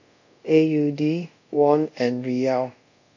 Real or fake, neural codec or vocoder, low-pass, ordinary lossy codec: fake; codec, 24 kHz, 0.5 kbps, DualCodec; 7.2 kHz; none